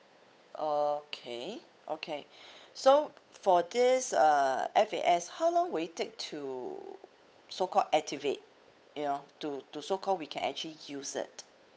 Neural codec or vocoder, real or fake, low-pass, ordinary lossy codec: codec, 16 kHz, 8 kbps, FunCodec, trained on Chinese and English, 25 frames a second; fake; none; none